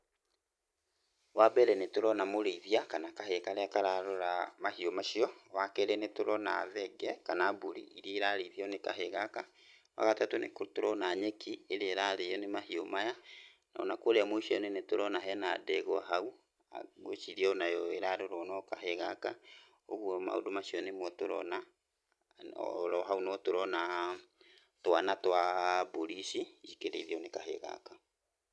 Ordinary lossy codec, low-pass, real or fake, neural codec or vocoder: none; none; real; none